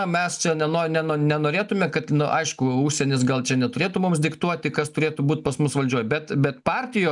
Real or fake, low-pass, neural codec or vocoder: real; 10.8 kHz; none